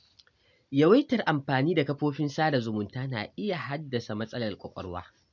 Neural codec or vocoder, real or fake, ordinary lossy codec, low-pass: none; real; none; 7.2 kHz